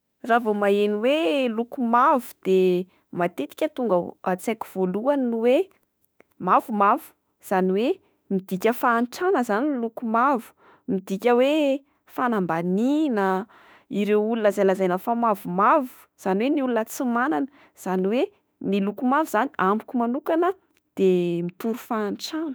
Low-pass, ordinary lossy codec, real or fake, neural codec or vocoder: none; none; fake; autoencoder, 48 kHz, 32 numbers a frame, DAC-VAE, trained on Japanese speech